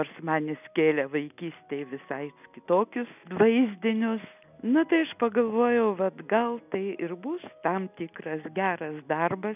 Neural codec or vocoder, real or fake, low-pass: none; real; 3.6 kHz